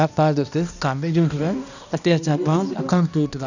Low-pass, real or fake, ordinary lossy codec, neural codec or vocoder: 7.2 kHz; fake; none; codec, 16 kHz, 1 kbps, X-Codec, HuBERT features, trained on balanced general audio